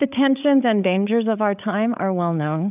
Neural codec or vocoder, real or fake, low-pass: codec, 16 kHz, 8 kbps, FreqCodec, larger model; fake; 3.6 kHz